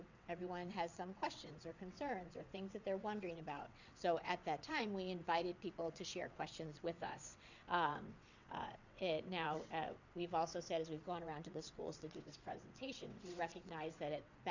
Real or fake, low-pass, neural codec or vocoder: fake; 7.2 kHz; vocoder, 22.05 kHz, 80 mel bands, WaveNeXt